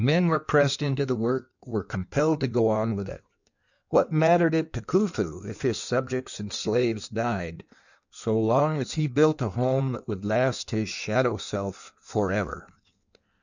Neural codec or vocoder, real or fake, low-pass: codec, 16 kHz in and 24 kHz out, 1.1 kbps, FireRedTTS-2 codec; fake; 7.2 kHz